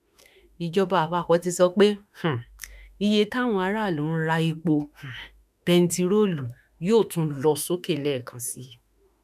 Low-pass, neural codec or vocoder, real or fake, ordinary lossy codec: 14.4 kHz; autoencoder, 48 kHz, 32 numbers a frame, DAC-VAE, trained on Japanese speech; fake; none